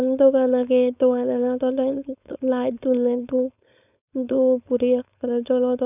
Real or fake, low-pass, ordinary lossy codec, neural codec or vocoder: fake; 3.6 kHz; none; codec, 16 kHz, 4.8 kbps, FACodec